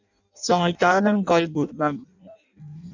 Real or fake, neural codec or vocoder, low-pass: fake; codec, 16 kHz in and 24 kHz out, 0.6 kbps, FireRedTTS-2 codec; 7.2 kHz